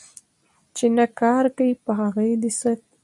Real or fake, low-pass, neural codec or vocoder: real; 10.8 kHz; none